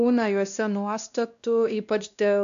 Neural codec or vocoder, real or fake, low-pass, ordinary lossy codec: codec, 16 kHz, 1 kbps, X-Codec, WavLM features, trained on Multilingual LibriSpeech; fake; 7.2 kHz; AAC, 96 kbps